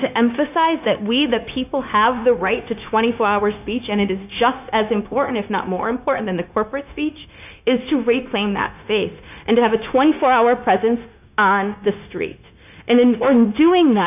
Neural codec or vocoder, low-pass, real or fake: codec, 16 kHz, 0.9 kbps, LongCat-Audio-Codec; 3.6 kHz; fake